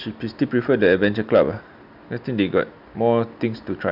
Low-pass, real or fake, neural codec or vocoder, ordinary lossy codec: 5.4 kHz; real; none; none